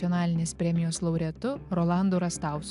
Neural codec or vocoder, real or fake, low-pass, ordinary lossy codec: none; real; 10.8 kHz; AAC, 96 kbps